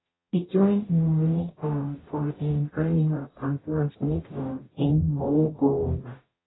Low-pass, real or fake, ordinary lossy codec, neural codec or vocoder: 7.2 kHz; fake; AAC, 16 kbps; codec, 44.1 kHz, 0.9 kbps, DAC